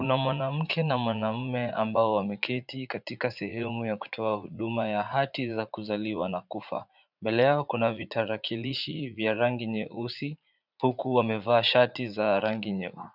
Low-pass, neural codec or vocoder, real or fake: 5.4 kHz; vocoder, 44.1 kHz, 80 mel bands, Vocos; fake